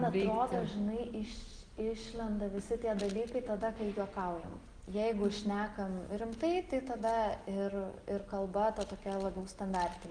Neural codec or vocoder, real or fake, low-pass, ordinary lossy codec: none; real; 9.9 kHz; Opus, 24 kbps